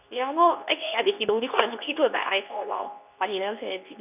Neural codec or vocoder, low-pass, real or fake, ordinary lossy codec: codec, 24 kHz, 0.9 kbps, WavTokenizer, medium speech release version 2; 3.6 kHz; fake; none